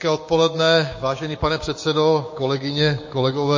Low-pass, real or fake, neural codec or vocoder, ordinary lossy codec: 7.2 kHz; fake; codec, 16 kHz, 6 kbps, DAC; MP3, 32 kbps